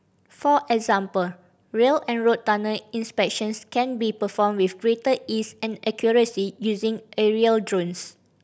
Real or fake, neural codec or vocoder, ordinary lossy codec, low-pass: real; none; none; none